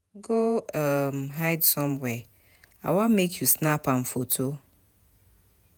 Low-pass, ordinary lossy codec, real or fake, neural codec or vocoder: none; none; fake; vocoder, 48 kHz, 128 mel bands, Vocos